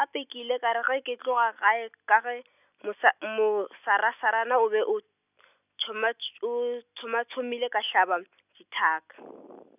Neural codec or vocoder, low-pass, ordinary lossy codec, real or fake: none; 3.6 kHz; none; real